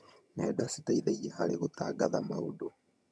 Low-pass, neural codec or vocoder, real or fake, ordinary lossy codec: none; vocoder, 22.05 kHz, 80 mel bands, HiFi-GAN; fake; none